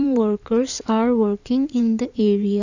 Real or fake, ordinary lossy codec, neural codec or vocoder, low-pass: fake; none; codec, 16 kHz in and 24 kHz out, 2.2 kbps, FireRedTTS-2 codec; 7.2 kHz